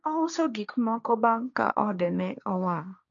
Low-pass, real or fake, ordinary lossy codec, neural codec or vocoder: 7.2 kHz; fake; none; codec, 16 kHz, 1.1 kbps, Voila-Tokenizer